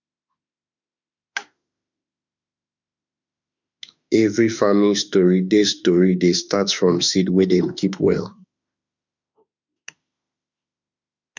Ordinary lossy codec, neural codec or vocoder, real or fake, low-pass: none; autoencoder, 48 kHz, 32 numbers a frame, DAC-VAE, trained on Japanese speech; fake; 7.2 kHz